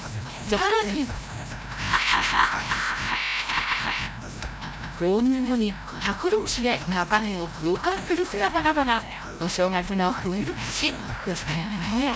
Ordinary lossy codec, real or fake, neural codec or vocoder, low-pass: none; fake; codec, 16 kHz, 0.5 kbps, FreqCodec, larger model; none